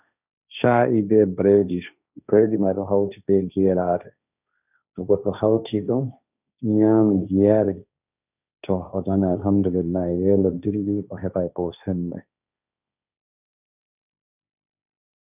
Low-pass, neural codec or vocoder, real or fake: 3.6 kHz; codec, 16 kHz, 1.1 kbps, Voila-Tokenizer; fake